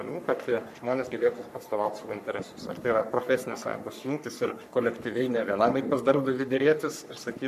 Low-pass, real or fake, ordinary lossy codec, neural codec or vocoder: 14.4 kHz; fake; MP3, 96 kbps; codec, 44.1 kHz, 3.4 kbps, Pupu-Codec